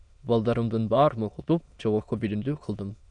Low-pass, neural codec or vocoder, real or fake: 9.9 kHz; autoencoder, 22.05 kHz, a latent of 192 numbers a frame, VITS, trained on many speakers; fake